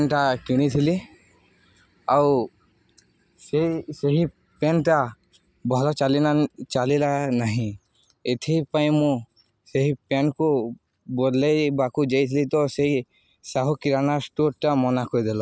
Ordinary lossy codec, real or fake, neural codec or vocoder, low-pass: none; real; none; none